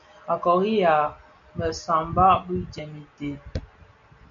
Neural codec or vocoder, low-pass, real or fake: none; 7.2 kHz; real